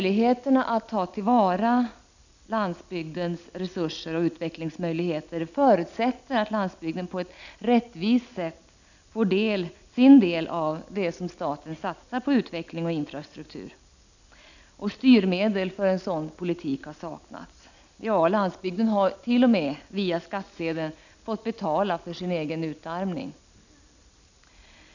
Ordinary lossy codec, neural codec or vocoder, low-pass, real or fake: none; none; 7.2 kHz; real